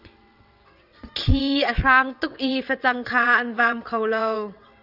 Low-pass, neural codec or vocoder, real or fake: 5.4 kHz; vocoder, 22.05 kHz, 80 mel bands, WaveNeXt; fake